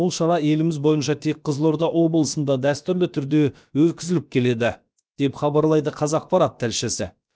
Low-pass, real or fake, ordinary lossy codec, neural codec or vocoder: none; fake; none; codec, 16 kHz, about 1 kbps, DyCAST, with the encoder's durations